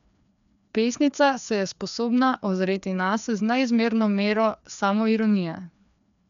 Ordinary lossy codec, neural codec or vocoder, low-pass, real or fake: none; codec, 16 kHz, 2 kbps, FreqCodec, larger model; 7.2 kHz; fake